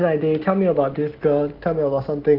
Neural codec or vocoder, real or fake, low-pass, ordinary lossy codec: none; real; 5.4 kHz; Opus, 16 kbps